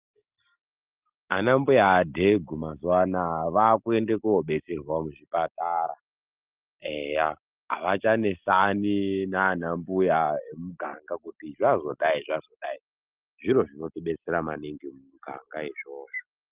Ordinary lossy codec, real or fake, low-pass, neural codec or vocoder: Opus, 24 kbps; real; 3.6 kHz; none